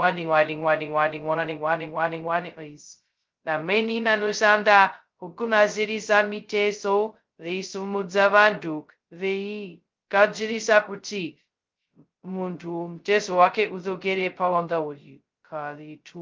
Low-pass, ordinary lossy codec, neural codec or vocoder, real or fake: 7.2 kHz; Opus, 32 kbps; codec, 16 kHz, 0.2 kbps, FocalCodec; fake